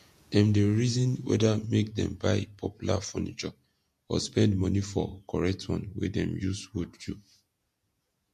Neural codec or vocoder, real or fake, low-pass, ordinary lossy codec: vocoder, 48 kHz, 128 mel bands, Vocos; fake; 14.4 kHz; MP3, 64 kbps